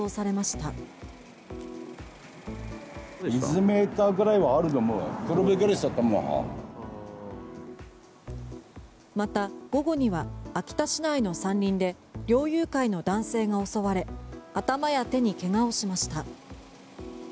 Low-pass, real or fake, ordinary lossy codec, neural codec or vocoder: none; real; none; none